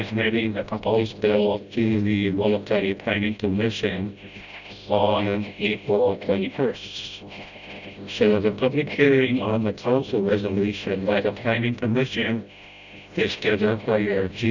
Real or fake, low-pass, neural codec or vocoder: fake; 7.2 kHz; codec, 16 kHz, 0.5 kbps, FreqCodec, smaller model